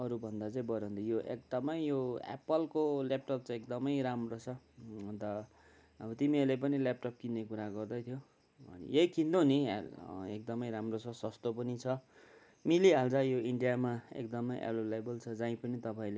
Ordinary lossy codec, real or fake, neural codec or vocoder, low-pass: none; real; none; none